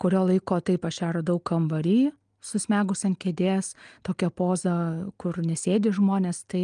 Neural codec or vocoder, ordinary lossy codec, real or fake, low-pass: none; Opus, 32 kbps; real; 9.9 kHz